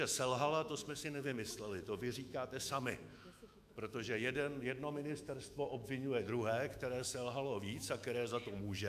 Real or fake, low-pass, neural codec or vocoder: fake; 14.4 kHz; autoencoder, 48 kHz, 128 numbers a frame, DAC-VAE, trained on Japanese speech